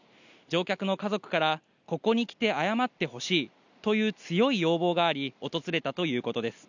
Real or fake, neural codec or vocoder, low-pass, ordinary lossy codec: real; none; 7.2 kHz; none